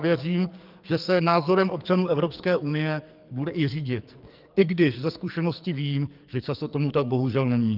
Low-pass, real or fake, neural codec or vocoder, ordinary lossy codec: 5.4 kHz; fake; codec, 44.1 kHz, 2.6 kbps, SNAC; Opus, 24 kbps